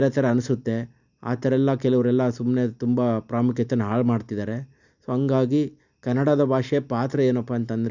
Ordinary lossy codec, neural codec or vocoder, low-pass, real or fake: none; none; 7.2 kHz; real